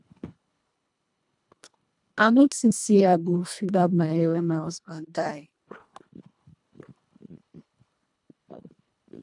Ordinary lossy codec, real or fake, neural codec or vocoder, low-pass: none; fake; codec, 24 kHz, 1.5 kbps, HILCodec; 10.8 kHz